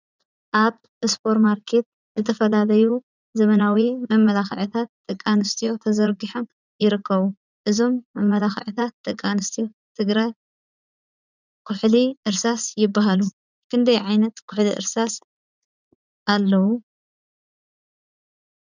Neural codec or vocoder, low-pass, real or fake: vocoder, 44.1 kHz, 80 mel bands, Vocos; 7.2 kHz; fake